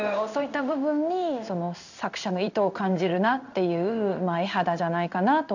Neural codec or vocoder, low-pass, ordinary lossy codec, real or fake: codec, 16 kHz in and 24 kHz out, 1 kbps, XY-Tokenizer; 7.2 kHz; none; fake